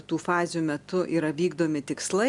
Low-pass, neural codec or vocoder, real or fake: 10.8 kHz; none; real